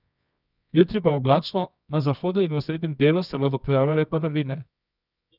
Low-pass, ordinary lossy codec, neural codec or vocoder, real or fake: 5.4 kHz; none; codec, 24 kHz, 0.9 kbps, WavTokenizer, medium music audio release; fake